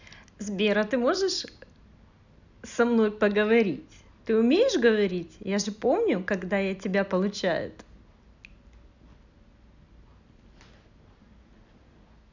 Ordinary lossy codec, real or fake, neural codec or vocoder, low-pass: none; real; none; 7.2 kHz